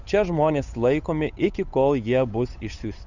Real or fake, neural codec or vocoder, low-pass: fake; codec, 16 kHz, 8 kbps, FunCodec, trained on Chinese and English, 25 frames a second; 7.2 kHz